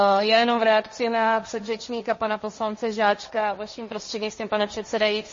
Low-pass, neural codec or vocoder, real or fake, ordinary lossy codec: 7.2 kHz; codec, 16 kHz, 1.1 kbps, Voila-Tokenizer; fake; MP3, 32 kbps